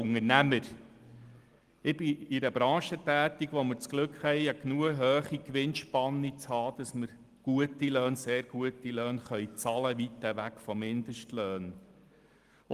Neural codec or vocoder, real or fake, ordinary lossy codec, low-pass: none; real; Opus, 24 kbps; 14.4 kHz